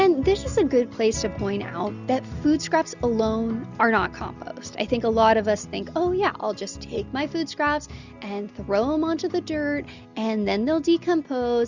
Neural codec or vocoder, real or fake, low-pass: none; real; 7.2 kHz